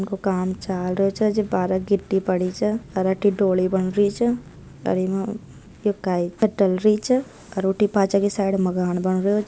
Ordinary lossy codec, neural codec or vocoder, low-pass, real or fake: none; none; none; real